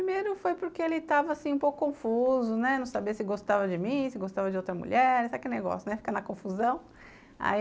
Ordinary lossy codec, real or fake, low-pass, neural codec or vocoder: none; real; none; none